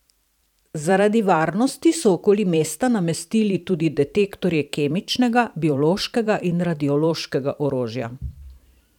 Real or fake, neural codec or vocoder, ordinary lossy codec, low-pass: fake; vocoder, 44.1 kHz, 128 mel bands every 512 samples, BigVGAN v2; none; 19.8 kHz